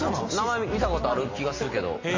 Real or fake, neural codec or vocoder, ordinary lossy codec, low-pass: real; none; MP3, 32 kbps; 7.2 kHz